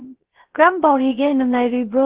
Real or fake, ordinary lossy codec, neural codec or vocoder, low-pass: fake; Opus, 16 kbps; codec, 16 kHz, 0.3 kbps, FocalCodec; 3.6 kHz